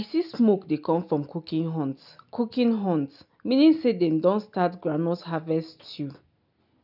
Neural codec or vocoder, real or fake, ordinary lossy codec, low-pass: none; real; none; 5.4 kHz